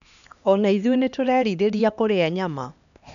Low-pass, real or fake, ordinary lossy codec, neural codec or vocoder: 7.2 kHz; fake; none; codec, 16 kHz, 2 kbps, X-Codec, HuBERT features, trained on LibriSpeech